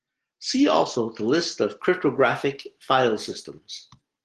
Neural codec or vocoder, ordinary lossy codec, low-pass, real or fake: none; Opus, 16 kbps; 10.8 kHz; real